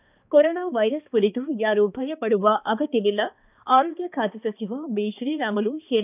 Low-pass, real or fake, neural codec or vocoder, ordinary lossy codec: 3.6 kHz; fake; codec, 16 kHz, 2 kbps, X-Codec, HuBERT features, trained on balanced general audio; none